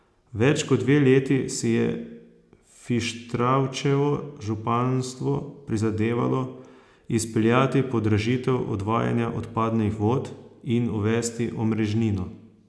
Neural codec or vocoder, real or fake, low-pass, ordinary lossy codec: none; real; none; none